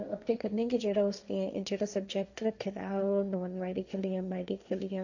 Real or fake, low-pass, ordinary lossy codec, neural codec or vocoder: fake; 7.2 kHz; none; codec, 16 kHz, 1.1 kbps, Voila-Tokenizer